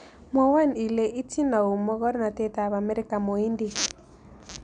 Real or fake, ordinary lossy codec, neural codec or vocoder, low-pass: real; none; none; 9.9 kHz